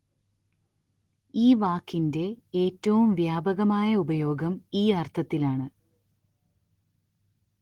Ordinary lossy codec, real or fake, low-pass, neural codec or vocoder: Opus, 16 kbps; real; 19.8 kHz; none